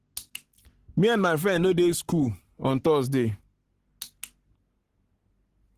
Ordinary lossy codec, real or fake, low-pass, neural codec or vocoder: Opus, 16 kbps; fake; 14.4 kHz; codec, 44.1 kHz, 7.8 kbps, Pupu-Codec